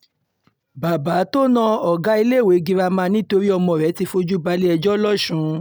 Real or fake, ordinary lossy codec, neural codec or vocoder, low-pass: real; none; none; none